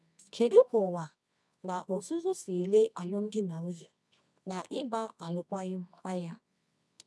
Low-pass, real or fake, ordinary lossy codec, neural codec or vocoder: none; fake; none; codec, 24 kHz, 0.9 kbps, WavTokenizer, medium music audio release